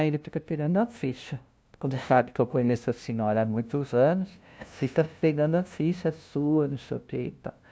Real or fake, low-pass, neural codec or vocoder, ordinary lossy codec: fake; none; codec, 16 kHz, 0.5 kbps, FunCodec, trained on LibriTTS, 25 frames a second; none